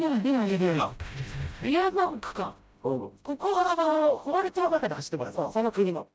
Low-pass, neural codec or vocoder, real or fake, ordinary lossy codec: none; codec, 16 kHz, 0.5 kbps, FreqCodec, smaller model; fake; none